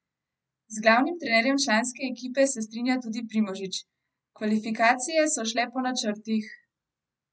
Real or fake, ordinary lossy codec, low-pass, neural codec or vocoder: real; none; none; none